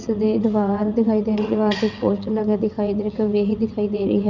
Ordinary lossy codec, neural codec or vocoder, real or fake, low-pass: none; vocoder, 22.05 kHz, 80 mel bands, WaveNeXt; fake; 7.2 kHz